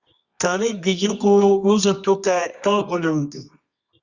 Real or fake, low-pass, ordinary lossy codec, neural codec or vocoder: fake; 7.2 kHz; Opus, 64 kbps; codec, 24 kHz, 0.9 kbps, WavTokenizer, medium music audio release